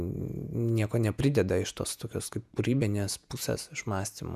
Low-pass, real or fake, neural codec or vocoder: 14.4 kHz; fake; vocoder, 48 kHz, 128 mel bands, Vocos